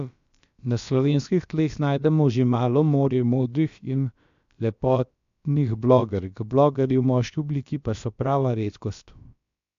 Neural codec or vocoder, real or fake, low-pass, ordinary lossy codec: codec, 16 kHz, about 1 kbps, DyCAST, with the encoder's durations; fake; 7.2 kHz; MP3, 64 kbps